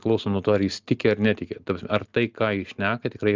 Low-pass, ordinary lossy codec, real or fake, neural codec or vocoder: 7.2 kHz; Opus, 24 kbps; real; none